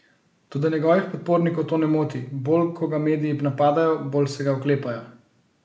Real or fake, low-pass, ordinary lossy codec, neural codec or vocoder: real; none; none; none